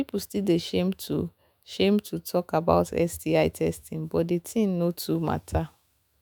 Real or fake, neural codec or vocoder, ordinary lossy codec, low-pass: fake; autoencoder, 48 kHz, 128 numbers a frame, DAC-VAE, trained on Japanese speech; none; none